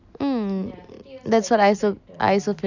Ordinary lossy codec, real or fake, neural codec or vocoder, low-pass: none; real; none; 7.2 kHz